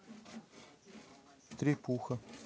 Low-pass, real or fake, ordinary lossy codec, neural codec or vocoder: none; real; none; none